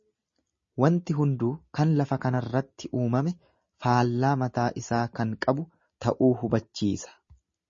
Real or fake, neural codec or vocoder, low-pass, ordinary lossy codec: real; none; 7.2 kHz; MP3, 32 kbps